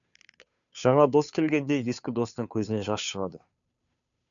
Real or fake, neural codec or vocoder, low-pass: fake; codec, 16 kHz, 2 kbps, FunCodec, trained on Chinese and English, 25 frames a second; 7.2 kHz